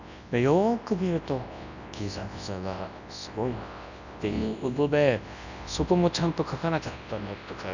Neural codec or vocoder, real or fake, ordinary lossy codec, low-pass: codec, 24 kHz, 0.9 kbps, WavTokenizer, large speech release; fake; none; 7.2 kHz